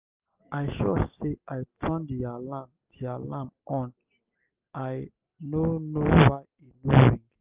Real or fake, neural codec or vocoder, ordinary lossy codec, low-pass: real; none; Opus, 24 kbps; 3.6 kHz